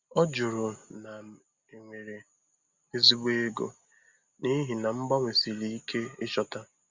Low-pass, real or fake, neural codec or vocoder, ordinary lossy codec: 7.2 kHz; real; none; Opus, 64 kbps